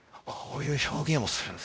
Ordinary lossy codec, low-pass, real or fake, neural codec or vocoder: none; none; fake; codec, 16 kHz, 0.5 kbps, X-Codec, WavLM features, trained on Multilingual LibriSpeech